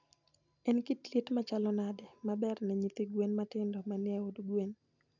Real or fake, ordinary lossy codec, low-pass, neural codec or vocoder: real; none; 7.2 kHz; none